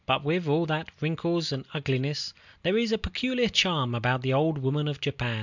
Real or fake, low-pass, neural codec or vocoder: real; 7.2 kHz; none